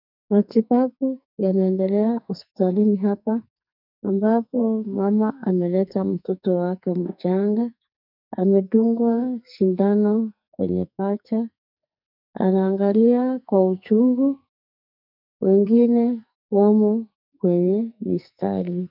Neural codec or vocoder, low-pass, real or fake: codec, 44.1 kHz, 2.6 kbps, SNAC; 5.4 kHz; fake